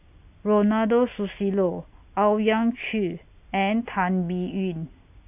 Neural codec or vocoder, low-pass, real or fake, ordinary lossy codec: none; 3.6 kHz; real; AAC, 32 kbps